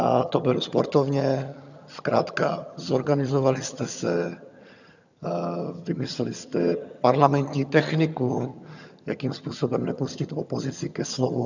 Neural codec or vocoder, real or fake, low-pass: vocoder, 22.05 kHz, 80 mel bands, HiFi-GAN; fake; 7.2 kHz